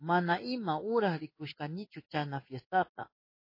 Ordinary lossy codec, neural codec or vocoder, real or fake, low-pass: MP3, 24 kbps; codec, 16 kHz in and 24 kHz out, 1 kbps, XY-Tokenizer; fake; 5.4 kHz